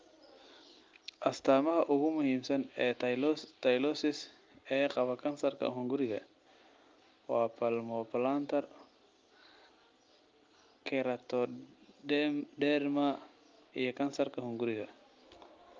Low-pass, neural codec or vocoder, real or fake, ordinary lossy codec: 7.2 kHz; none; real; Opus, 24 kbps